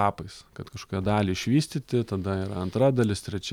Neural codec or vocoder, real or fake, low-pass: none; real; 19.8 kHz